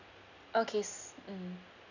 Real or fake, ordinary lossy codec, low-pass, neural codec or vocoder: real; none; 7.2 kHz; none